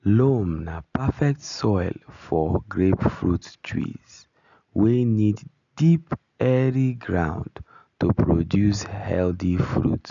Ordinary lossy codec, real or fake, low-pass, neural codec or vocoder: none; real; 7.2 kHz; none